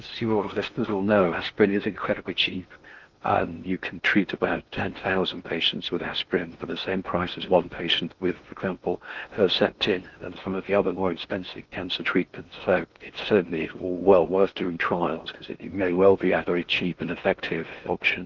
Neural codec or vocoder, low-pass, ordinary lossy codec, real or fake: codec, 16 kHz in and 24 kHz out, 0.8 kbps, FocalCodec, streaming, 65536 codes; 7.2 kHz; Opus, 32 kbps; fake